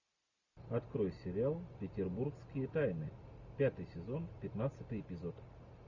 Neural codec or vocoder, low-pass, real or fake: none; 7.2 kHz; real